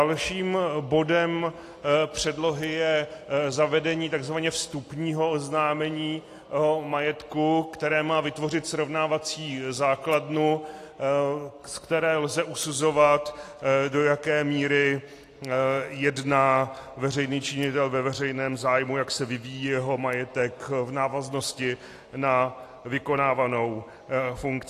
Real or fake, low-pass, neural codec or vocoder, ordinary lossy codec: real; 14.4 kHz; none; AAC, 48 kbps